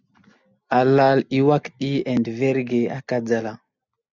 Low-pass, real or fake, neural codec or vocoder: 7.2 kHz; real; none